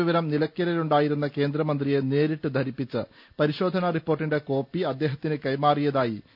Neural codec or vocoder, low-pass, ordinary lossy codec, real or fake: none; 5.4 kHz; none; real